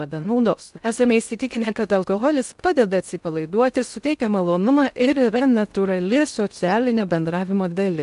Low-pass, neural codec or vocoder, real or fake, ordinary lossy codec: 10.8 kHz; codec, 16 kHz in and 24 kHz out, 0.6 kbps, FocalCodec, streaming, 2048 codes; fake; AAC, 96 kbps